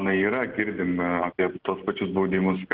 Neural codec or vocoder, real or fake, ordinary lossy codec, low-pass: none; real; Opus, 16 kbps; 5.4 kHz